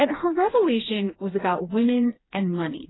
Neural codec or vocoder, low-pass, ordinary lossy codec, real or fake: codec, 16 kHz, 2 kbps, FreqCodec, smaller model; 7.2 kHz; AAC, 16 kbps; fake